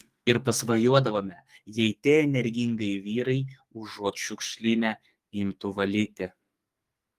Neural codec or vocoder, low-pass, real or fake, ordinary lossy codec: codec, 44.1 kHz, 2.6 kbps, SNAC; 14.4 kHz; fake; Opus, 32 kbps